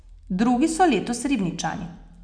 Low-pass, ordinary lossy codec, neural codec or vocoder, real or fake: 9.9 kHz; none; none; real